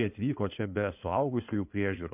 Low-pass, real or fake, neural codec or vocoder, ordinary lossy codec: 3.6 kHz; fake; codec, 16 kHz in and 24 kHz out, 2.2 kbps, FireRedTTS-2 codec; MP3, 32 kbps